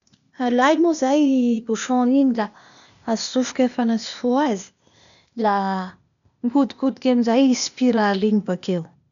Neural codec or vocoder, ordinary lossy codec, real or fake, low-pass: codec, 16 kHz, 0.8 kbps, ZipCodec; none; fake; 7.2 kHz